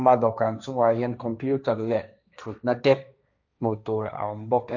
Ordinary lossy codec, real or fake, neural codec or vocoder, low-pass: none; fake; codec, 16 kHz, 1.1 kbps, Voila-Tokenizer; 7.2 kHz